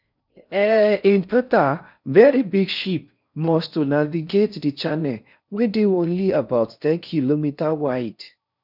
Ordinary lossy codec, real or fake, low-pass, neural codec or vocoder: none; fake; 5.4 kHz; codec, 16 kHz in and 24 kHz out, 0.6 kbps, FocalCodec, streaming, 4096 codes